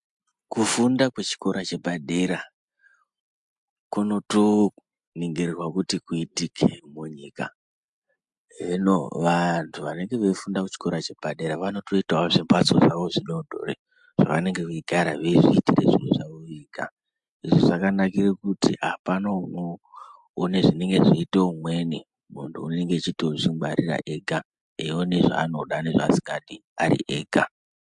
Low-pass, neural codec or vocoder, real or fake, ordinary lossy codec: 10.8 kHz; none; real; MP3, 64 kbps